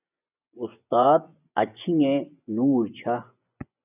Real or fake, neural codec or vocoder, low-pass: fake; vocoder, 24 kHz, 100 mel bands, Vocos; 3.6 kHz